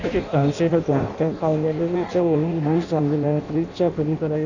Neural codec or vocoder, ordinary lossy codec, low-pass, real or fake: codec, 16 kHz in and 24 kHz out, 0.6 kbps, FireRedTTS-2 codec; none; 7.2 kHz; fake